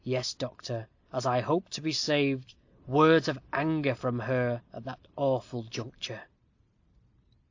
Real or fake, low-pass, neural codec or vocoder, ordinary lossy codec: real; 7.2 kHz; none; AAC, 48 kbps